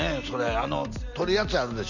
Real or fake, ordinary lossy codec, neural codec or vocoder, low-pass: real; none; none; 7.2 kHz